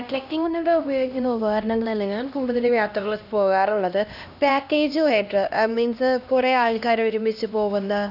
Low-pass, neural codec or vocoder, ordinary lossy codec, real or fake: 5.4 kHz; codec, 16 kHz, 1 kbps, X-Codec, HuBERT features, trained on LibriSpeech; none; fake